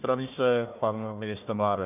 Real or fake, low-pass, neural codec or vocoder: fake; 3.6 kHz; codec, 16 kHz, 1 kbps, FunCodec, trained on Chinese and English, 50 frames a second